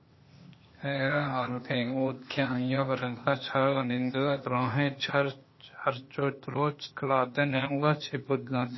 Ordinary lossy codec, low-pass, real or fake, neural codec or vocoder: MP3, 24 kbps; 7.2 kHz; fake; codec, 16 kHz, 0.8 kbps, ZipCodec